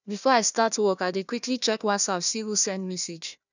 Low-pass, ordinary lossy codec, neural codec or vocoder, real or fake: 7.2 kHz; none; codec, 16 kHz, 1 kbps, FunCodec, trained on Chinese and English, 50 frames a second; fake